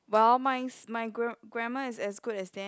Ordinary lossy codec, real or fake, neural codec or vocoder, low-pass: none; real; none; none